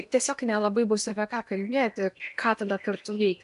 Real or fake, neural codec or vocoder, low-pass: fake; codec, 16 kHz in and 24 kHz out, 0.8 kbps, FocalCodec, streaming, 65536 codes; 10.8 kHz